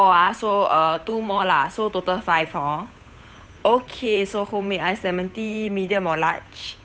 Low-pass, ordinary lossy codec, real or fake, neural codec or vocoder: none; none; fake; codec, 16 kHz, 8 kbps, FunCodec, trained on Chinese and English, 25 frames a second